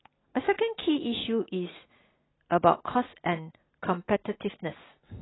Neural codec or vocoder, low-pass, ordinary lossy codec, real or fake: none; 7.2 kHz; AAC, 16 kbps; real